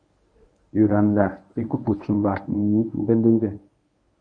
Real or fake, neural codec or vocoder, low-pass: fake; codec, 24 kHz, 0.9 kbps, WavTokenizer, medium speech release version 1; 9.9 kHz